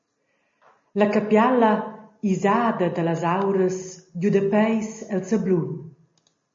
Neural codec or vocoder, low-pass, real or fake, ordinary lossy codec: none; 7.2 kHz; real; MP3, 32 kbps